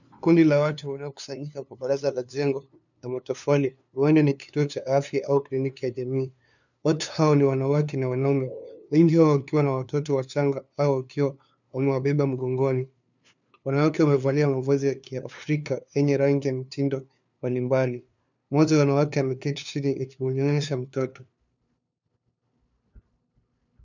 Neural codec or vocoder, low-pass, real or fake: codec, 16 kHz, 2 kbps, FunCodec, trained on LibriTTS, 25 frames a second; 7.2 kHz; fake